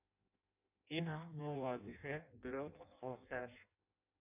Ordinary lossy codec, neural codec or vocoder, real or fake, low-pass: AAC, 32 kbps; codec, 16 kHz in and 24 kHz out, 0.6 kbps, FireRedTTS-2 codec; fake; 3.6 kHz